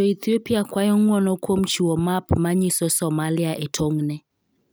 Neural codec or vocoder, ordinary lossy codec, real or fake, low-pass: none; none; real; none